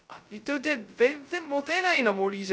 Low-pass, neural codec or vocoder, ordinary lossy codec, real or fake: none; codec, 16 kHz, 0.2 kbps, FocalCodec; none; fake